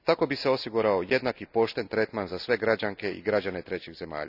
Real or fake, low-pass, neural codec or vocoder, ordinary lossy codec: real; 5.4 kHz; none; none